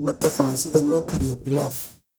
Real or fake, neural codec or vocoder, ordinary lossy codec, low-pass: fake; codec, 44.1 kHz, 0.9 kbps, DAC; none; none